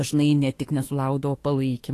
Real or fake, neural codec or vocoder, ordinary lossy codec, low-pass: fake; autoencoder, 48 kHz, 32 numbers a frame, DAC-VAE, trained on Japanese speech; AAC, 48 kbps; 14.4 kHz